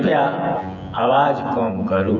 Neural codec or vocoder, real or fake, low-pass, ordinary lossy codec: vocoder, 24 kHz, 100 mel bands, Vocos; fake; 7.2 kHz; none